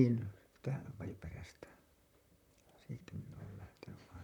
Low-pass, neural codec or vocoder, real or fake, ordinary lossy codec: 19.8 kHz; vocoder, 44.1 kHz, 128 mel bands, Pupu-Vocoder; fake; none